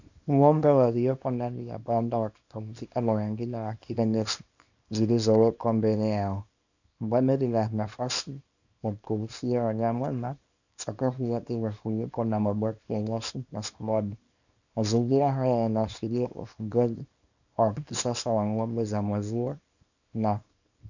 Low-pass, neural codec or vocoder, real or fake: 7.2 kHz; codec, 24 kHz, 0.9 kbps, WavTokenizer, small release; fake